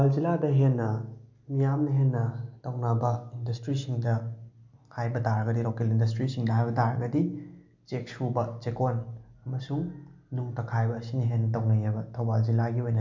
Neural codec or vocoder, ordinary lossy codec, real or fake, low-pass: none; MP3, 64 kbps; real; 7.2 kHz